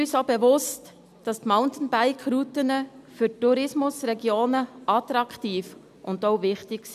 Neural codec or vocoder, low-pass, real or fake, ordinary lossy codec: none; 14.4 kHz; real; none